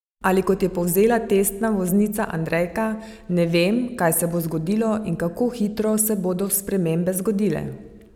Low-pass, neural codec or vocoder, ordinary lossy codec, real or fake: 19.8 kHz; none; none; real